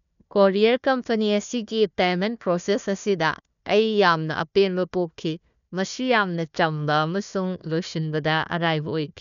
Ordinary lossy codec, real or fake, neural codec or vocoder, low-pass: none; fake; codec, 16 kHz, 1 kbps, FunCodec, trained on Chinese and English, 50 frames a second; 7.2 kHz